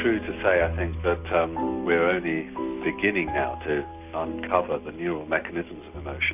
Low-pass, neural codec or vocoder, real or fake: 3.6 kHz; none; real